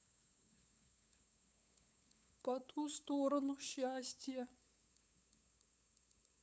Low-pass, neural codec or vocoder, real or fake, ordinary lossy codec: none; codec, 16 kHz, 8 kbps, FunCodec, trained on LibriTTS, 25 frames a second; fake; none